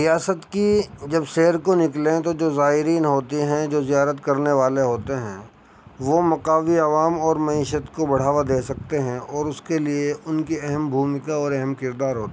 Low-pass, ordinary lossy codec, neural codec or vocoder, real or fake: none; none; none; real